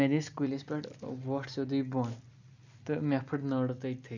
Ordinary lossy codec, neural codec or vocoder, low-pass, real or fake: none; none; 7.2 kHz; real